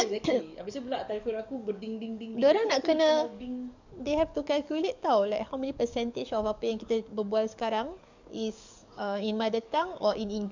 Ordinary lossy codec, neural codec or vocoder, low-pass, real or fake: none; codec, 44.1 kHz, 7.8 kbps, DAC; 7.2 kHz; fake